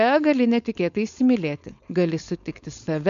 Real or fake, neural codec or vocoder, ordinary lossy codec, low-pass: fake; codec, 16 kHz, 4.8 kbps, FACodec; MP3, 64 kbps; 7.2 kHz